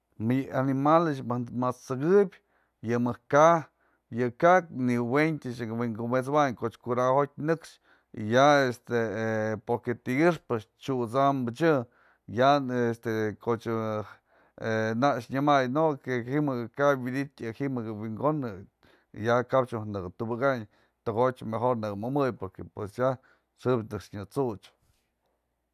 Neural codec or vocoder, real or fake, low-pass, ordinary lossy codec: none; real; 14.4 kHz; none